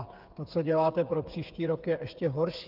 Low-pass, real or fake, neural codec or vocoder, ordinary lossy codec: 5.4 kHz; fake; codec, 16 kHz, 8 kbps, FreqCodec, smaller model; Opus, 32 kbps